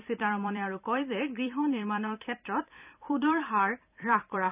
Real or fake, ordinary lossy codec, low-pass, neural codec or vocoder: fake; none; 3.6 kHz; vocoder, 44.1 kHz, 128 mel bands every 256 samples, BigVGAN v2